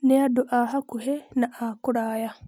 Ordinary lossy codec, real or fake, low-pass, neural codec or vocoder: none; real; 19.8 kHz; none